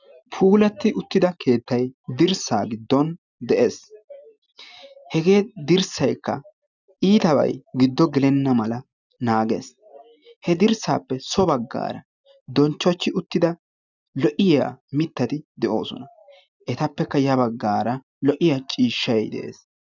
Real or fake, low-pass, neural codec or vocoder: real; 7.2 kHz; none